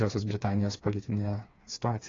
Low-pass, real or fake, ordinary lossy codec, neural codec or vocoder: 7.2 kHz; fake; AAC, 32 kbps; codec, 16 kHz, 4 kbps, FreqCodec, smaller model